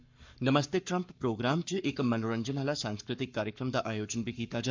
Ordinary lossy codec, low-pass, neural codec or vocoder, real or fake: MP3, 64 kbps; 7.2 kHz; codec, 44.1 kHz, 7.8 kbps, Pupu-Codec; fake